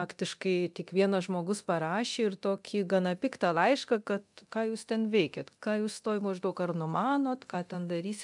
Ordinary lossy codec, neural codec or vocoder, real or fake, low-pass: MP3, 96 kbps; codec, 24 kHz, 0.9 kbps, DualCodec; fake; 10.8 kHz